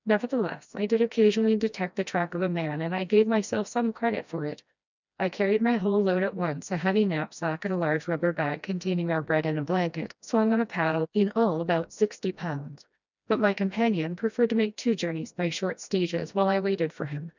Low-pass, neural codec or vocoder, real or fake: 7.2 kHz; codec, 16 kHz, 1 kbps, FreqCodec, smaller model; fake